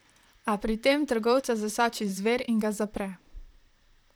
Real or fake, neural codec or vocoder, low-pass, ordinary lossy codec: fake; vocoder, 44.1 kHz, 128 mel bands, Pupu-Vocoder; none; none